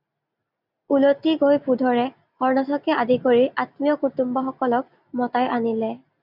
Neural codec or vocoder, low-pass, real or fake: none; 5.4 kHz; real